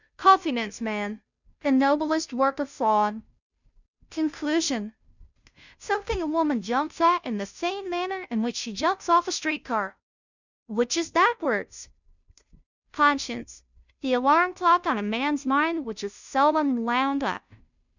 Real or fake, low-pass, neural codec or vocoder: fake; 7.2 kHz; codec, 16 kHz, 0.5 kbps, FunCodec, trained on Chinese and English, 25 frames a second